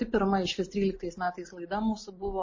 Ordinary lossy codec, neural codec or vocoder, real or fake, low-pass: MP3, 32 kbps; none; real; 7.2 kHz